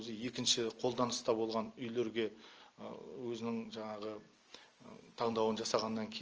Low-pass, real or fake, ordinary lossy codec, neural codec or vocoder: 7.2 kHz; real; Opus, 24 kbps; none